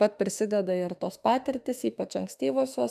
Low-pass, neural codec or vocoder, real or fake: 14.4 kHz; autoencoder, 48 kHz, 32 numbers a frame, DAC-VAE, trained on Japanese speech; fake